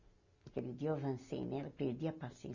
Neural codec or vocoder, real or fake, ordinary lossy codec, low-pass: none; real; none; 7.2 kHz